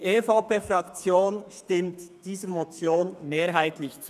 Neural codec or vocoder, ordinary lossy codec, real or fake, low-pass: codec, 32 kHz, 1.9 kbps, SNAC; none; fake; 14.4 kHz